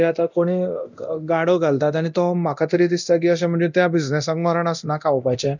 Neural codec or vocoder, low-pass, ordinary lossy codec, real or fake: codec, 24 kHz, 0.9 kbps, DualCodec; 7.2 kHz; none; fake